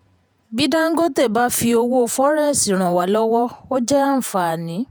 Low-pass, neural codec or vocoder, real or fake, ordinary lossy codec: none; vocoder, 48 kHz, 128 mel bands, Vocos; fake; none